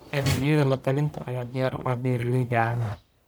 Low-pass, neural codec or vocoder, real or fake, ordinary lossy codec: none; codec, 44.1 kHz, 1.7 kbps, Pupu-Codec; fake; none